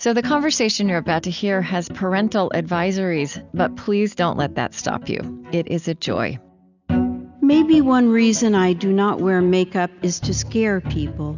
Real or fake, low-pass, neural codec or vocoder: real; 7.2 kHz; none